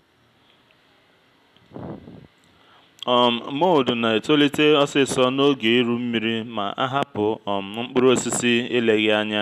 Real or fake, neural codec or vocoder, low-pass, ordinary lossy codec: real; none; 14.4 kHz; none